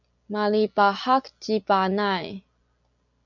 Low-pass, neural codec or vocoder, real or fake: 7.2 kHz; none; real